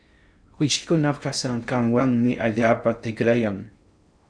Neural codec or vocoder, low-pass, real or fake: codec, 16 kHz in and 24 kHz out, 0.6 kbps, FocalCodec, streaming, 2048 codes; 9.9 kHz; fake